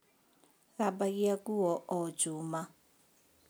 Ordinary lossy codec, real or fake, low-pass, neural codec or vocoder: none; real; none; none